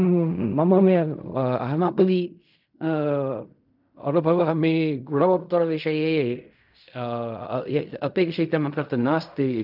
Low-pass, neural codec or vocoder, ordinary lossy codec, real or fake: 5.4 kHz; codec, 16 kHz in and 24 kHz out, 0.4 kbps, LongCat-Audio-Codec, fine tuned four codebook decoder; none; fake